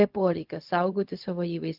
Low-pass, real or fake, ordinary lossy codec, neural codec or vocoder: 5.4 kHz; fake; Opus, 24 kbps; codec, 16 kHz, 0.4 kbps, LongCat-Audio-Codec